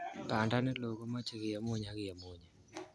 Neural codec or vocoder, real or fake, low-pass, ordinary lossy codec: none; real; none; none